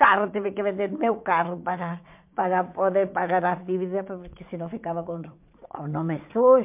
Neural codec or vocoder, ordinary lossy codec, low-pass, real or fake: vocoder, 22.05 kHz, 80 mel bands, WaveNeXt; MP3, 32 kbps; 3.6 kHz; fake